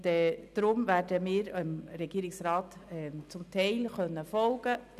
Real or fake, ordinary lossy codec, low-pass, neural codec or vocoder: real; none; 14.4 kHz; none